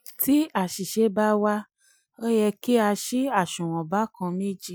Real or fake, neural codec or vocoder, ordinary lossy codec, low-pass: real; none; none; none